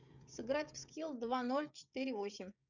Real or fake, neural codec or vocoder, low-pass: fake; codec, 16 kHz, 16 kbps, FreqCodec, smaller model; 7.2 kHz